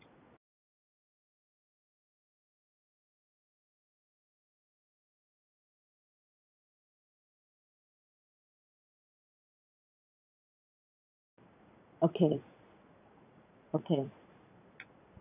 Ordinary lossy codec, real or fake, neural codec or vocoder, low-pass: none; fake; vocoder, 44.1 kHz, 80 mel bands, Vocos; 3.6 kHz